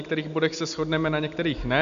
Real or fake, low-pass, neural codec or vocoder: real; 7.2 kHz; none